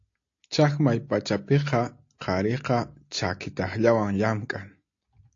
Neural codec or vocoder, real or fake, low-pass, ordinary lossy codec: none; real; 7.2 kHz; AAC, 48 kbps